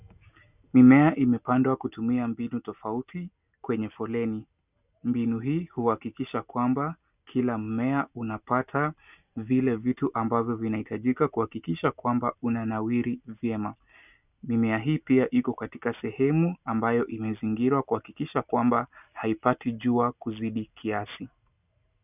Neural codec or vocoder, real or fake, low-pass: none; real; 3.6 kHz